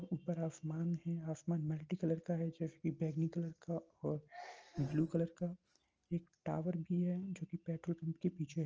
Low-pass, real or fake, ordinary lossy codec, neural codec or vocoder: 7.2 kHz; real; Opus, 24 kbps; none